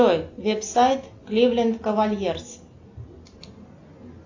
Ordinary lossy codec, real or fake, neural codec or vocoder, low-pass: AAC, 48 kbps; real; none; 7.2 kHz